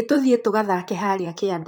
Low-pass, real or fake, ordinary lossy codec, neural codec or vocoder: 19.8 kHz; fake; none; vocoder, 44.1 kHz, 128 mel bands, Pupu-Vocoder